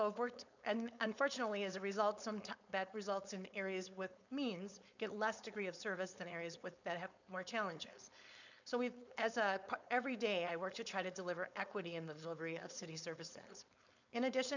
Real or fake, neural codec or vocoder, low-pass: fake; codec, 16 kHz, 4.8 kbps, FACodec; 7.2 kHz